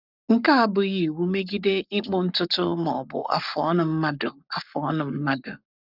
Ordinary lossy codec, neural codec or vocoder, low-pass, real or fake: none; codec, 44.1 kHz, 7.8 kbps, Pupu-Codec; 5.4 kHz; fake